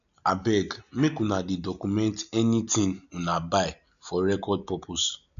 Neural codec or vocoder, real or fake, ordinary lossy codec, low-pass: none; real; none; 7.2 kHz